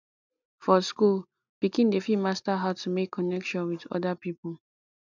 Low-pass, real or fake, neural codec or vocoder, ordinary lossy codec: 7.2 kHz; real; none; none